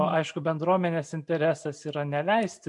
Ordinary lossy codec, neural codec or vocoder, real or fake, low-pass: MP3, 64 kbps; none; real; 10.8 kHz